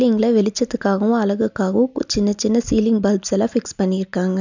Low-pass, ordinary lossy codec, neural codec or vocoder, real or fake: 7.2 kHz; none; none; real